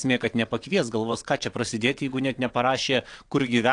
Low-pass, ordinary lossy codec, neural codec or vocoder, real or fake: 9.9 kHz; AAC, 64 kbps; vocoder, 22.05 kHz, 80 mel bands, WaveNeXt; fake